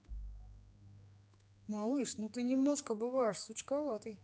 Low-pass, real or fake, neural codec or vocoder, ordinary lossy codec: none; fake; codec, 16 kHz, 2 kbps, X-Codec, HuBERT features, trained on general audio; none